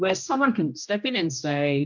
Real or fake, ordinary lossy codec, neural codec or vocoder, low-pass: fake; MP3, 64 kbps; codec, 16 kHz, 1 kbps, X-Codec, HuBERT features, trained on general audio; 7.2 kHz